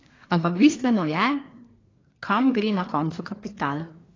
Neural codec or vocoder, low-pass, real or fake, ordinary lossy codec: codec, 24 kHz, 1 kbps, SNAC; 7.2 kHz; fake; AAC, 32 kbps